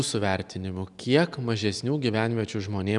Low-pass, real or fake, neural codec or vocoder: 10.8 kHz; real; none